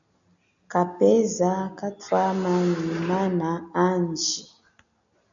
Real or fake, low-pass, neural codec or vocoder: real; 7.2 kHz; none